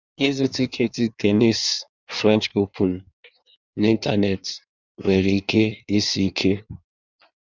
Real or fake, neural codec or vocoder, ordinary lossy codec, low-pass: fake; codec, 16 kHz in and 24 kHz out, 1.1 kbps, FireRedTTS-2 codec; none; 7.2 kHz